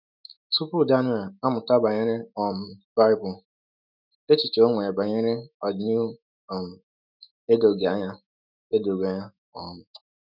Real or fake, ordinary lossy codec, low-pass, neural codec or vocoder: fake; none; 5.4 kHz; autoencoder, 48 kHz, 128 numbers a frame, DAC-VAE, trained on Japanese speech